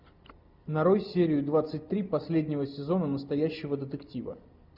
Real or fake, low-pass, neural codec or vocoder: real; 5.4 kHz; none